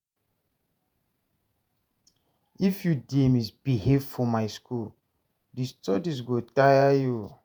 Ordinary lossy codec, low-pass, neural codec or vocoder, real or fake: none; none; vocoder, 48 kHz, 128 mel bands, Vocos; fake